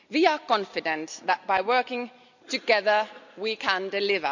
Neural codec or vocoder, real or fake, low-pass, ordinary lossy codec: none; real; 7.2 kHz; none